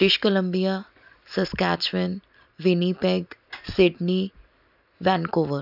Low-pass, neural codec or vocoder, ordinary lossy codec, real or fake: 5.4 kHz; none; none; real